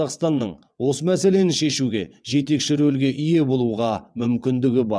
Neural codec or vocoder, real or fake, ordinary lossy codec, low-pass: vocoder, 22.05 kHz, 80 mel bands, WaveNeXt; fake; none; none